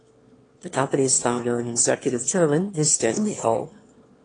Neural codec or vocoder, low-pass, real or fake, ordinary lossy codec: autoencoder, 22.05 kHz, a latent of 192 numbers a frame, VITS, trained on one speaker; 9.9 kHz; fake; AAC, 32 kbps